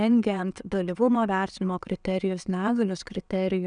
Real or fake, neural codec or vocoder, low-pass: fake; vocoder, 22.05 kHz, 80 mel bands, WaveNeXt; 9.9 kHz